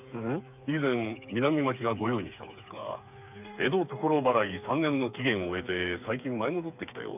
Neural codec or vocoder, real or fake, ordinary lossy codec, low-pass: codec, 16 kHz, 4 kbps, FreqCodec, smaller model; fake; none; 3.6 kHz